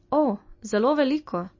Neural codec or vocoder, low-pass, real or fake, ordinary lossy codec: none; 7.2 kHz; real; MP3, 32 kbps